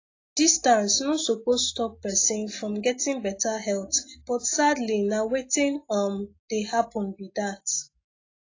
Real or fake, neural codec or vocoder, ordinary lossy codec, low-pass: real; none; AAC, 32 kbps; 7.2 kHz